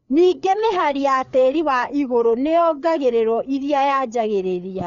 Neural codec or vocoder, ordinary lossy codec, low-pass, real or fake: codec, 16 kHz, 4 kbps, FreqCodec, larger model; Opus, 64 kbps; 7.2 kHz; fake